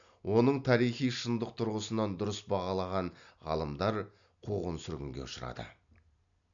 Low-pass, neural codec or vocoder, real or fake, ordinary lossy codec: 7.2 kHz; none; real; none